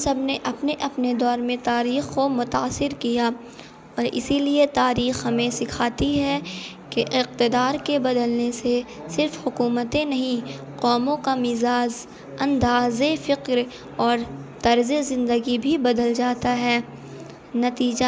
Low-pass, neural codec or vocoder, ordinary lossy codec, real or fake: none; none; none; real